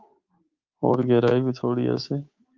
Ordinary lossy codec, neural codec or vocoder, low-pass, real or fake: Opus, 32 kbps; codec, 24 kHz, 3.1 kbps, DualCodec; 7.2 kHz; fake